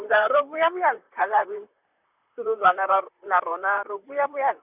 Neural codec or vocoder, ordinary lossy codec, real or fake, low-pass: vocoder, 44.1 kHz, 128 mel bands, Pupu-Vocoder; none; fake; 3.6 kHz